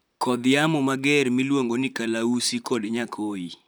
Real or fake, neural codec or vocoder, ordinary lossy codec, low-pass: fake; vocoder, 44.1 kHz, 128 mel bands, Pupu-Vocoder; none; none